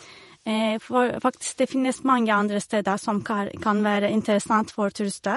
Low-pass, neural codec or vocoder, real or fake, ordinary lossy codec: 19.8 kHz; vocoder, 48 kHz, 128 mel bands, Vocos; fake; MP3, 48 kbps